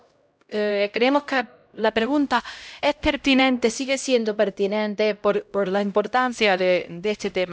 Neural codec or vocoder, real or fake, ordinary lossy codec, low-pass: codec, 16 kHz, 0.5 kbps, X-Codec, HuBERT features, trained on LibriSpeech; fake; none; none